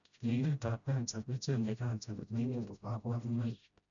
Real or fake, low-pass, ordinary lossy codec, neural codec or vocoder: fake; 7.2 kHz; none; codec, 16 kHz, 0.5 kbps, FreqCodec, smaller model